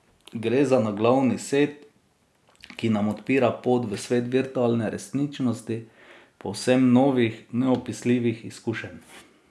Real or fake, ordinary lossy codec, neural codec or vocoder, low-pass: real; none; none; none